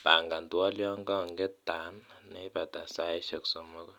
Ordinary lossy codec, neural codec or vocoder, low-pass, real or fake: none; none; 19.8 kHz; real